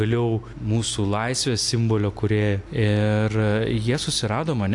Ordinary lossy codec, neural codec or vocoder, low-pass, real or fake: AAC, 64 kbps; none; 10.8 kHz; real